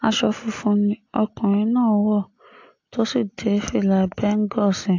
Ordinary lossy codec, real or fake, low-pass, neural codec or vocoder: AAC, 48 kbps; real; 7.2 kHz; none